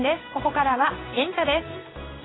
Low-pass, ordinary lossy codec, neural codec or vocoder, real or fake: 7.2 kHz; AAC, 16 kbps; codec, 16 kHz in and 24 kHz out, 1 kbps, XY-Tokenizer; fake